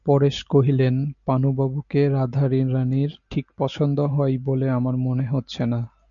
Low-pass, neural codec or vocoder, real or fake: 7.2 kHz; none; real